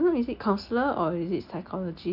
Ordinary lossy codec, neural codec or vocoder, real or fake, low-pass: none; none; real; 5.4 kHz